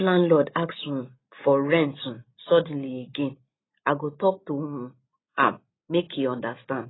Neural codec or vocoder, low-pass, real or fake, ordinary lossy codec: none; 7.2 kHz; real; AAC, 16 kbps